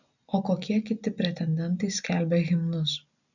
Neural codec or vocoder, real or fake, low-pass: none; real; 7.2 kHz